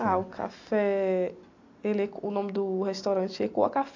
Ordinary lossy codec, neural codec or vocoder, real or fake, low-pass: AAC, 48 kbps; none; real; 7.2 kHz